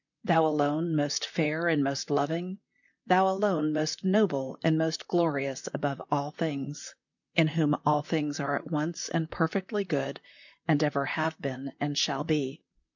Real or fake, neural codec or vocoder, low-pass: fake; vocoder, 44.1 kHz, 128 mel bands, Pupu-Vocoder; 7.2 kHz